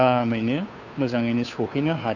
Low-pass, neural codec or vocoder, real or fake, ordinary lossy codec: 7.2 kHz; codec, 16 kHz, 2 kbps, FunCodec, trained on Chinese and English, 25 frames a second; fake; none